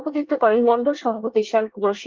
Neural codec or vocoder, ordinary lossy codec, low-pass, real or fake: codec, 24 kHz, 1 kbps, SNAC; Opus, 32 kbps; 7.2 kHz; fake